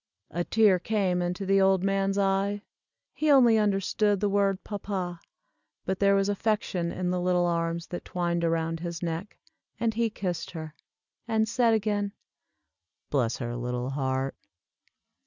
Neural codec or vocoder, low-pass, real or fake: none; 7.2 kHz; real